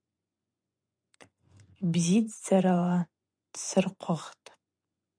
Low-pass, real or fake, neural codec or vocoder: 9.9 kHz; fake; vocoder, 24 kHz, 100 mel bands, Vocos